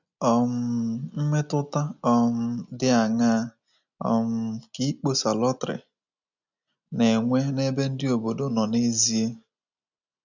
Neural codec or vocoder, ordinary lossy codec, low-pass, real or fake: none; none; 7.2 kHz; real